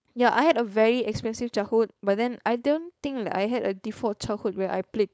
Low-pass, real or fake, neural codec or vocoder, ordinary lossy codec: none; fake; codec, 16 kHz, 4.8 kbps, FACodec; none